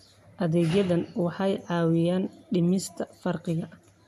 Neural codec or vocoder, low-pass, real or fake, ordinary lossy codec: none; 14.4 kHz; real; MP3, 64 kbps